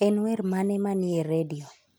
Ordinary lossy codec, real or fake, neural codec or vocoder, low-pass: none; real; none; none